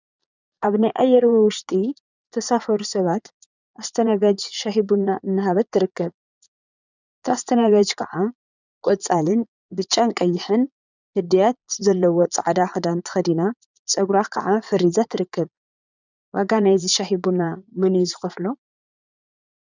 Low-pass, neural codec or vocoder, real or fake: 7.2 kHz; vocoder, 22.05 kHz, 80 mel bands, Vocos; fake